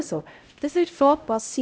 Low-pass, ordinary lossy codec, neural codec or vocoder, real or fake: none; none; codec, 16 kHz, 0.5 kbps, X-Codec, HuBERT features, trained on LibriSpeech; fake